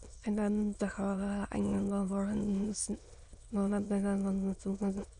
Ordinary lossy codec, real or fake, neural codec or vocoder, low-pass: none; fake; autoencoder, 22.05 kHz, a latent of 192 numbers a frame, VITS, trained on many speakers; 9.9 kHz